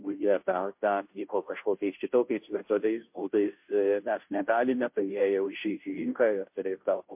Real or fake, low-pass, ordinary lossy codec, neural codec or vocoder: fake; 3.6 kHz; MP3, 32 kbps; codec, 16 kHz, 0.5 kbps, FunCodec, trained on Chinese and English, 25 frames a second